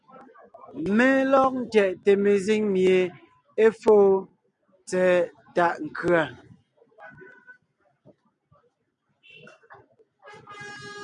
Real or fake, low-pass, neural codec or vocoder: real; 9.9 kHz; none